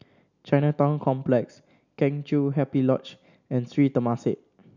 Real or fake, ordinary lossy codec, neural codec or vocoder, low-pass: real; none; none; 7.2 kHz